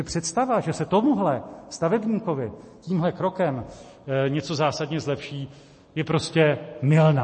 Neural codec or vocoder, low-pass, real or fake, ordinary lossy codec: none; 10.8 kHz; real; MP3, 32 kbps